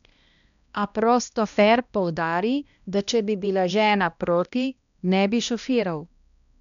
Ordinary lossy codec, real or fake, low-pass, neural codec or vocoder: none; fake; 7.2 kHz; codec, 16 kHz, 1 kbps, X-Codec, HuBERT features, trained on balanced general audio